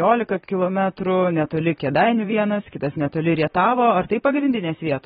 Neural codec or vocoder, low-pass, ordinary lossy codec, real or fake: vocoder, 44.1 kHz, 128 mel bands, Pupu-Vocoder; 19.8 kHz; AAC, 16 kbps; fake